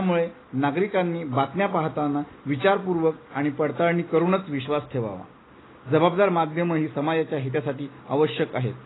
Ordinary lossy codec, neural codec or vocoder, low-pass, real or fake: AAC, 16 kbps; none; 7.2 kHz; real